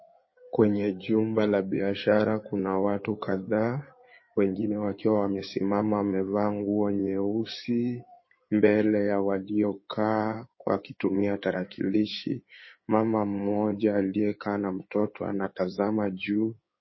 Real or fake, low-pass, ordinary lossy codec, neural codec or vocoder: fake; 7.2 kHz; MP3, 24 kbps; codec, 16 kHz in and 24 kHz out, 2.2 kbps, FireRedTTS-2 codec